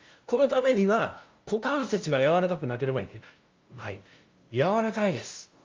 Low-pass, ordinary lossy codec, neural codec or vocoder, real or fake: 7.2 kHz; Opus, 32 kbps; codec, 16 kHz, 0.5 kbps, FunCodec, trained on LibriTTS, 25 frames a second; fake